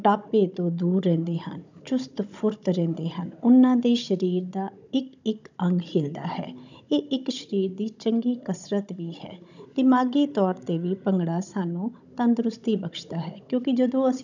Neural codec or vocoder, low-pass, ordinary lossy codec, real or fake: codec, 16 kHz, 16 kbps, FunCodec, trained on Chinese and English, 50 frames a second; 7.2 kHz; none; fake